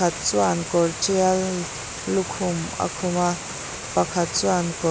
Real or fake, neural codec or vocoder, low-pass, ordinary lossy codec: real; none; none; none